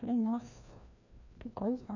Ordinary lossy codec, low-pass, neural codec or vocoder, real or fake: none; 7.2 kHz; codec, 16 kHz, 1 kbps, FreqCodec, larger model; fake